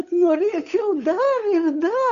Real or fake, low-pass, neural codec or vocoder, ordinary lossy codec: fake; 7.2 kHz; codec, 16 kHz, 8 kbps, FunCodec, trained on Chinese and English, 25 frames a second; Opus, 64 kbps